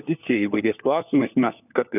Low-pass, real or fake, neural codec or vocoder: 3.6 kHz; fake; codec, 16 kHz, 4 kbps, FunCodec, trained on LibriTTS, 50 frames a second